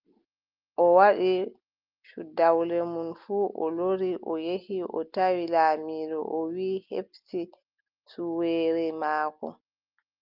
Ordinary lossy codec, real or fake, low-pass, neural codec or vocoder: Opus, 24 kbps; real; 5.4 kHz; none